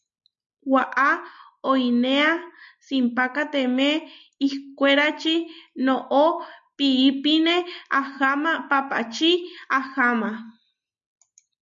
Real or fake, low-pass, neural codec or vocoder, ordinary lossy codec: real; 7.2 kHz; none; MP3, 48 kbps